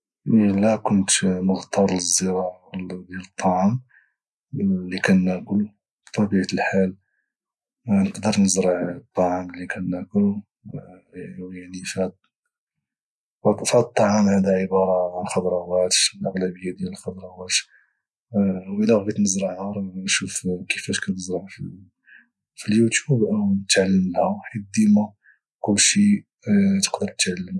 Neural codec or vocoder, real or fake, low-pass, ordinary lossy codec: none; real; none; none